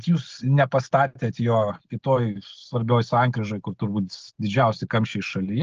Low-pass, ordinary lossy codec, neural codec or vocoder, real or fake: 7.2 kHz; Opus, 16 kbps; none; real